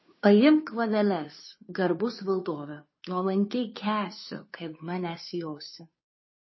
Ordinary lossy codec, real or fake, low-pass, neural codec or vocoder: MP3, 24 kbps; fake; 7.2 kHz; codec, 16 kHz, 2 kbps, FunCodec, trained on Chinese and English, 25 frames a second